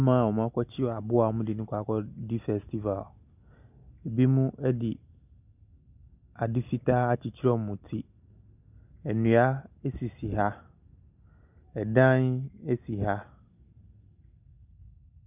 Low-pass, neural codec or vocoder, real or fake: 3.6 kHz; none; real